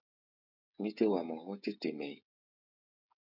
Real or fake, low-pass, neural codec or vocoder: fake; 5.4 kHz; codec, 16 kHz, 16 kbps, FreqCodec, smaller model